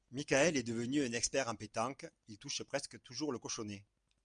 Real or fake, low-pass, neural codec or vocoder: real; 9.9 kHz; none